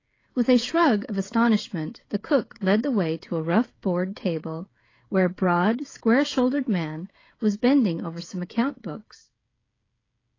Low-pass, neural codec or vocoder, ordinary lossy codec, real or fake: 7.2 kHz; codec, 16 kHz, 16 kbps, FreqCodec, smaller model; AAC, 32 kbps; fake